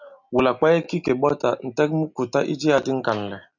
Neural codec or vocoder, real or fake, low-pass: none; real; 7.2 kHz